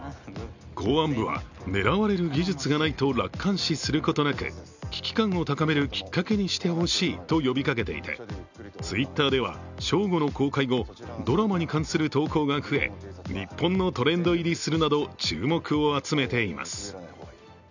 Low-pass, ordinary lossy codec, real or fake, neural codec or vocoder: 7.2 kHz; none; real; none